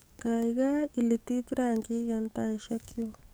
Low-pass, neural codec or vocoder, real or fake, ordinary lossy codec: none; codec, 44.1 kHz, 7.8 kbps, DAC; fake; none